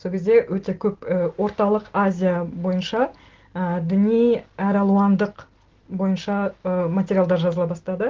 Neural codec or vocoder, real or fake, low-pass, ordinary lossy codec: none; real; 7.2 kHz; Opus, 16 kbps